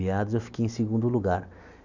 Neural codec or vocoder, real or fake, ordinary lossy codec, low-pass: none; real; none; 7.2 kHz